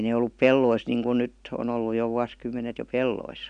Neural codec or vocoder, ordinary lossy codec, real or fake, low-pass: none; none; real; 9.9 kHz